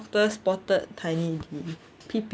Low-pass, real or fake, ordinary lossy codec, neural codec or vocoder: none; real; none; none